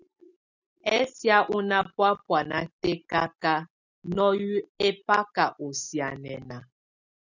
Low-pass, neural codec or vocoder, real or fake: 7.2 kHz; none; real